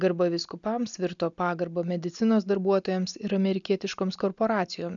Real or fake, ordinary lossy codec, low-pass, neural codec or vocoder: real; MP3, 96 kbps; 7.2 kHz; none